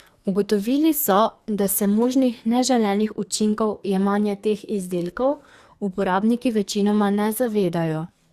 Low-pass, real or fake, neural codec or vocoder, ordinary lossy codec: 14.4 kHz; fake; codec, 44.1 kHz, 2.6 kbps, SNAC; Opus, 64 kbps